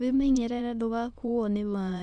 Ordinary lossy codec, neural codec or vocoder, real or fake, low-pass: none; autoencoder, 22.05 kHz, a latent of 192 numbers a frame, VITS, trained on many speakers; fake; 9.9 kHz